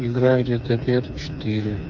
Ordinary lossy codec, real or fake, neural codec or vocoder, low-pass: AAC, 48 kbps; fake; codec, 16 kHz, 4 kbps, FreqCodec, smaller model; 7.2 kHz